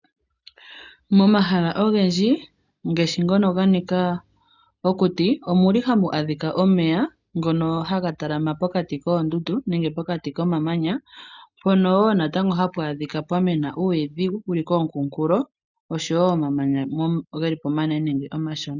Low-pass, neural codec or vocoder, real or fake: 7.2 kHz; none; real